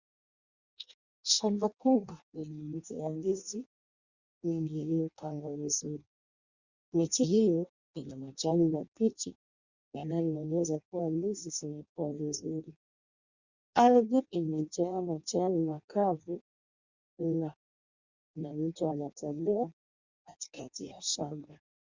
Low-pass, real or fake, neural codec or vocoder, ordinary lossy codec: 7.2 kHz; fake; codec, 16 kHz in and 24 kHz out, 0.6 kbps, FireRedTTS-2 codec; Opus, 64 kbps